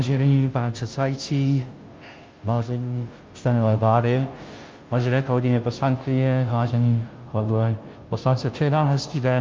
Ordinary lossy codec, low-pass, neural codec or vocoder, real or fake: Opus, 24 kbps; 7.2 kHz; codec, 16 kHz, 0.5 kbps, FunCodec, trained on Chinese and English, 25 frames a second; fake